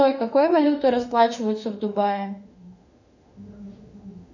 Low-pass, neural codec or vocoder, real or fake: 7.2 kHz; autoencoder, 48 kHz, 32 numbers a frame, DAC-VAE, trained on Japanese speech; fake